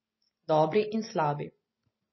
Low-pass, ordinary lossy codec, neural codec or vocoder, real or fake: 7.2 kHz; MP3, 24 kbps; none; real